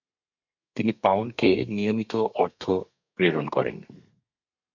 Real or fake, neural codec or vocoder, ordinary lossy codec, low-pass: fake; codec, 32 kHz, 1.9 kbps, SNAC; MP3, 48 kbps; 7.2 kHz